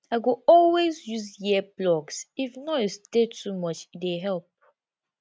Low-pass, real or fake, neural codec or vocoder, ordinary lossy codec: none; real; none; none